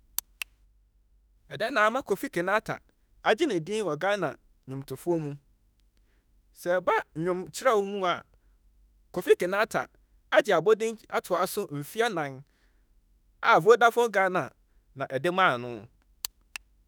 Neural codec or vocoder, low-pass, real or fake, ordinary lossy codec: autoencoder, 48 kHz, 32 numbers a frame, DAC-VAE, trained on Japanese speech; none; fake; none